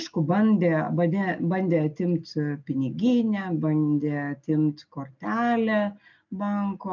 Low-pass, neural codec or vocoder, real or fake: 7.2 kHz; none; real